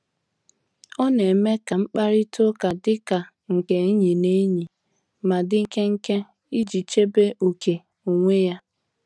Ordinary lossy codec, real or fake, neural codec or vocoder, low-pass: none; real; none; none